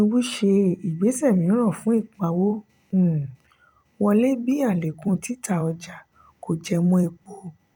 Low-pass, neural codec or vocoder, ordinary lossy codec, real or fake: 19.8 kHz; vocoder, 44.1 kHz, 128 mel bands every 256 samples, BigVGAN v2; none; fake